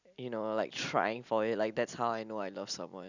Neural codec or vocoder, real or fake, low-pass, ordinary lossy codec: none; real; 7.2 kHz; none